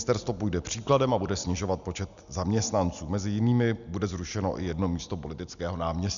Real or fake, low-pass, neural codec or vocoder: real; 7.2 kHz; none